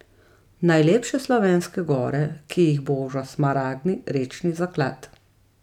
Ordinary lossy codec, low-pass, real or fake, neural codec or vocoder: none; 19.8 kHz; real; none